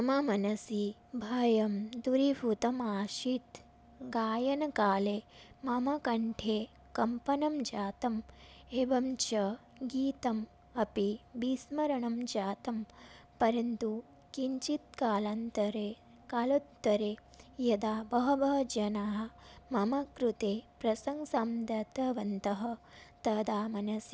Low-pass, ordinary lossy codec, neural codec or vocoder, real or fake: none; none; none; real